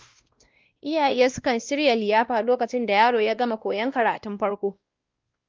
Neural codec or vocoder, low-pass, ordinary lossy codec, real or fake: codec, 16 kHz, 1 kbps, X-Codec, WavLM features, trained on Multilingual LibriSpeech; 7.2 kHz; Opus, 32 kbps; fake